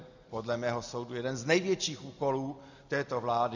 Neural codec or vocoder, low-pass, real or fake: none; 7.2 kHz; real